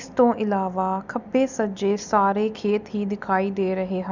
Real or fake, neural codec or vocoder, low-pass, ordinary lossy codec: real; none; 7.2 kHz; none